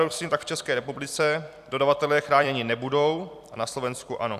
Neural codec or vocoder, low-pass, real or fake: vocoder, 44.1 kHz, 128 mel bands every 256 samples, BigVGAN v2; 14.4 kHz; fake